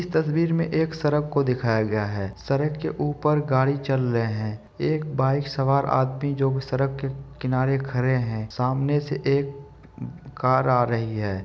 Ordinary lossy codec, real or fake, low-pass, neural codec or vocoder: none; real; none; none